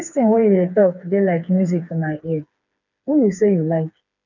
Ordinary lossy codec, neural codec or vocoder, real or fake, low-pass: none; codec, 16 kHz, 4 kbps, FreqCodec, smaller model; fake; 7.2 kHz